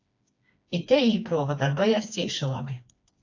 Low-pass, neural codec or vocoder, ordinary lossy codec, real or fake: 7.2 kHz; codec, 16 kHz, 2 kbps, FreqCodec, smaller model; AAC, 48 kbps; fake